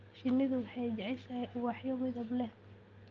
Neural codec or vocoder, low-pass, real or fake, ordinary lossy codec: none; 7.2 kHz; real; Opus, 24 kbps